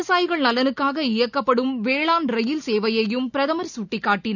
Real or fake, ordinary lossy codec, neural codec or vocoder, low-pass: real; none; none; 7.2 kHz